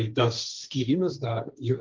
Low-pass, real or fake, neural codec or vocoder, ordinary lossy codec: 7.2 kHz; fake; codec, 16 kHz, 1.1 kbps, Voila-Tokenizer; Opus, 24 kbps